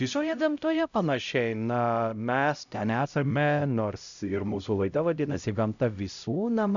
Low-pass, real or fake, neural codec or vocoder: 7.2 kHz; fake; codec, 16 kHz, 0.5 kbps, X-Codec, HuBERT features, trained on LibriSpeech